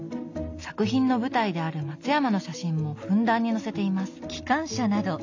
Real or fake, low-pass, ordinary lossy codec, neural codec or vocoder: real; 7.2 kHz; AAC, 48 kbps; none